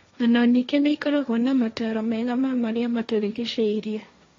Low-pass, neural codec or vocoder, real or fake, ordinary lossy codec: 7.2 kHz; codec, 16 kHz, 1.1 kbps, Voila-Tokenizer; fake; AAC, 32 kbps